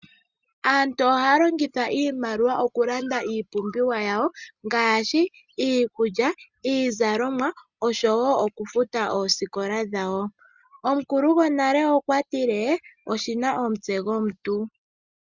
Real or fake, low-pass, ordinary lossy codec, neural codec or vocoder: real; 7.2 kHz; Opus, 64 kbps; none